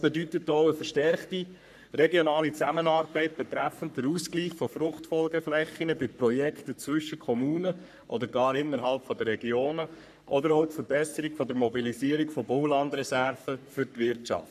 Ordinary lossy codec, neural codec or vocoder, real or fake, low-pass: none; codec, 44.1 kHz, 3.4 kbps, Pupu-Codec; fake; 14.4 kHz